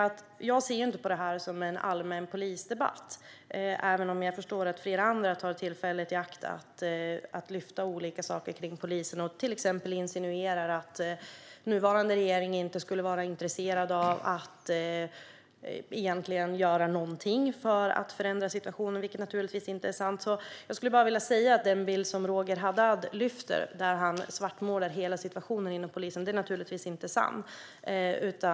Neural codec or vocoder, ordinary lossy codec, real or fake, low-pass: none; none; real; none